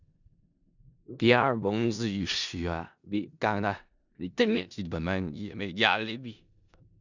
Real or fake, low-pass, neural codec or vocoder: fake; 7.2 kHz; codec, 16 kHz in and 24 kHz out, 0.4 kbps, LongCat-Audio-Codec, four codebook decoder